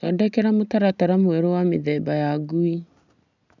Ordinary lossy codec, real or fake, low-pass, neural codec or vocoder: none; real; 7.2 kHz; none